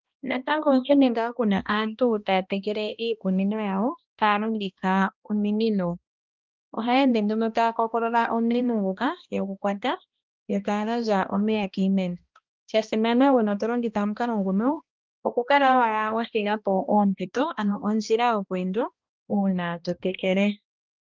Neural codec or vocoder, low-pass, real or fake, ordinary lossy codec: codec, 16 kHz, 1 kbps, X-Codec, HuBERT features, trained on balanced general audio; 7.2 kHz; fake; Opus, 32 kbps